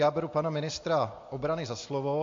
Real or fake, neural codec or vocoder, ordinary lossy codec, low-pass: real; none; AAC, 48 kbps; 7.2 kHz